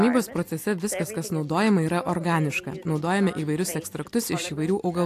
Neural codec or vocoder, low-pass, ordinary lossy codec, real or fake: none; 14.4 kHz; AAC, 64 kbps; real